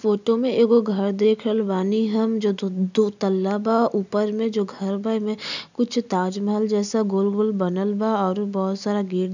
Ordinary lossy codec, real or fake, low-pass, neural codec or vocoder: none; real; 7.2 kHz; none